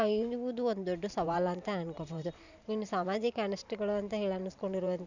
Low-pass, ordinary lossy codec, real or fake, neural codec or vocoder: 7.2 kHz; none; fake; vocoder, 44.1 kHz, 128 mel bands every 512 samples, BigVGAN v2